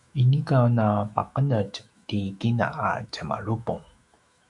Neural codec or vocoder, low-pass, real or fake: autoencoder, 48 kHz, 128 numbers a frame, DAC-VAE, trained on Japanese speech; 10.8 kHz; fake